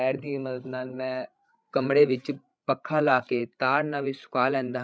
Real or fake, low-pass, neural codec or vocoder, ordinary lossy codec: fake; none; codec, 16 kHz, 8 kbps, FreqCodec, larger model; none